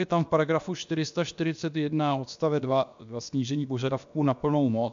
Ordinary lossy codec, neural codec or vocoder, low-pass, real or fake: MP3, 64 kbps; codec, 16 kHz, about 1 kbps, DyCAST, with the encoder's durations; 7.2 kHz; fake